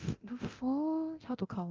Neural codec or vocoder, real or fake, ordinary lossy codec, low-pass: codec, 24 kHz, 0.9 kbps, DualCodec; fake; Opus, 32 kbps; 7.2 kHz